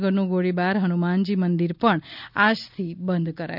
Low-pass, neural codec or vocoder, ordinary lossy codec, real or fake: 5.4 kHz; none; none; real